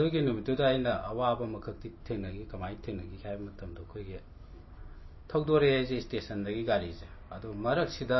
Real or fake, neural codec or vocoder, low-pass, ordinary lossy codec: real; none; 7.2 kHz; MP3, 24 kbps